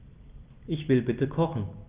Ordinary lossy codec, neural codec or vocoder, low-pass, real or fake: Opus, 24 kbps; none; 3.6 kHz; real